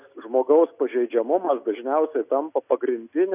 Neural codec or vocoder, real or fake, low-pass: none; real; 3.6 kHz